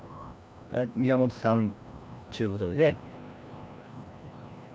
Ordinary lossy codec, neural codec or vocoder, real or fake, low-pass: none; codec, 16 kHz, 1 kbps, FreqCodec, larger model; fake; none